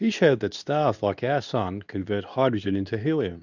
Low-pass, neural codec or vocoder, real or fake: 7.2 kHz; codec, 24 kHz, 0.9 kbps, WavTokenizer, medium speech release version 2; fake